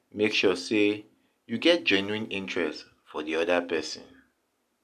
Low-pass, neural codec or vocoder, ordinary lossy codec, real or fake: 14.4 kHz; none; none; real